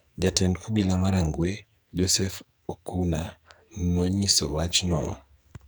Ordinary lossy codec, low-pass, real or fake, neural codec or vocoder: none; none; fake; codec, 44.1 kHz, 2.6 kbps, SNAC